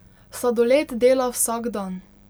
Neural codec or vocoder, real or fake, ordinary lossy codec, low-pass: none; real; none; none